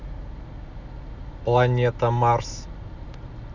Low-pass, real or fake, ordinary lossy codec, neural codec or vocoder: 7.2 kHz; real; none; none